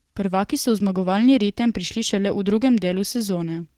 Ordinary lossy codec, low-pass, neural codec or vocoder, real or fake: Opus, 16 kbps; 19.8 kHz; codec, 44.1 kHz, 7.8 kbps, DAC; fake